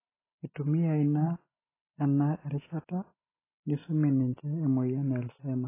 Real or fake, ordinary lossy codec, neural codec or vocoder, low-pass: real; AAC, 16 kbps; none; 3.6 kHz